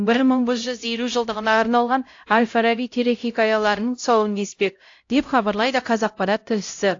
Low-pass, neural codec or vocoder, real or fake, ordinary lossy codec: 7.2 kHz; codec, 16 kHz, 0.5 kbps, X-Codec, HuBERT features, trained on LibriSpeech; fake; AAC, 48 kbps